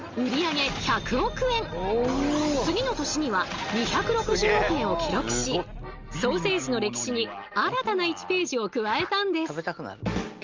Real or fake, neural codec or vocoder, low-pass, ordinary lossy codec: real; none; 7.2 kHz; Opus, 32 kbps